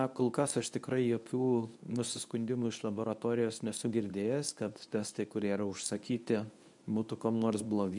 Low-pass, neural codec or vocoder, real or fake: 10.8 kHz; codec, 24 kHz, 0.9 kbps, WavTokenizer, medium speech release version 1; fake